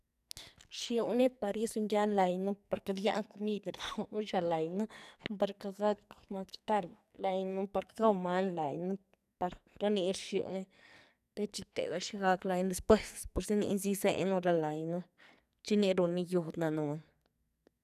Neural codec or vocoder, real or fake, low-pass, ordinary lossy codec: codec, 44.1 kHz, 2.6 kbps, SNAC; fake; 14.4 kHz; none